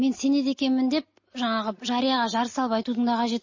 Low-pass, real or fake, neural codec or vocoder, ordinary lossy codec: 7.2 kHz; real; none; MP3, 32 kbps